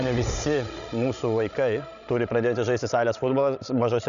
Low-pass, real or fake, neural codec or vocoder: 7.2 kHz; fake; codec, 16 kHz, 16 kbps, FreqCodec, larger model